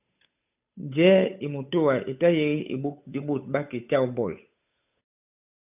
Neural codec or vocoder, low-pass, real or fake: codec, 16 kHz, 8 kbps, FunCodec, trained on Chinese and English, 25 frames a second; 3.6 kHz; fake